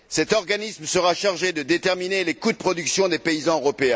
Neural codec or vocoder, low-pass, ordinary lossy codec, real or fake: none; none; none; real